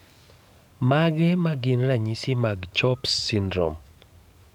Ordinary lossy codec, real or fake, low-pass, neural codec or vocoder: none; fake; 19.8 kHz; codec, 44.1 kHz, 7.8 kbps, DAC